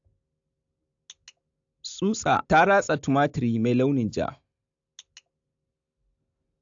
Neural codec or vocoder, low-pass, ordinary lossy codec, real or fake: none; 7.2 kHz; AAC, 64 kbps; real